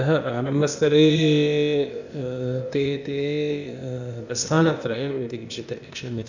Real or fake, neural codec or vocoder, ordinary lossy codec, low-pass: fake; codec, 16 kHz, 0.8 kbps, ZipCodec; none; 7.2 kHz